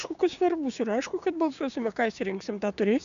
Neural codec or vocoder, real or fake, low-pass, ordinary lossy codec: codec, 16 kHz, 6 kbps, DAC; fake; 7.2 kHz; AAC, 96 kbps